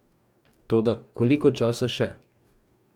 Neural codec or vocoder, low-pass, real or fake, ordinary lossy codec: codec, 44.1 kHz, 2.6 kbps, DAC; 19.8 kHz; fake; none